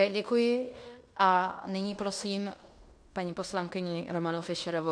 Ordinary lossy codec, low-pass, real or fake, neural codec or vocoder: MP3, 64 kbps; 9.9 kHz; fake; codec, 16 kHz in and 24 kHz out, 0.9 kbps, LongCat-Audio-Codec, fine tuned four codebook decoder